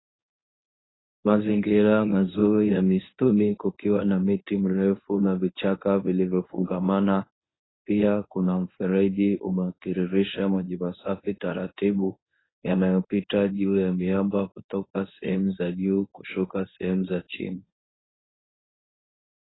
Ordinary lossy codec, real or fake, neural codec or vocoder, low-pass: AAC, 16 kbps; fake; codec, 24 kHz, 0.9 kbps, WavTokenizer, medium speech release version 1; 7.2 kHz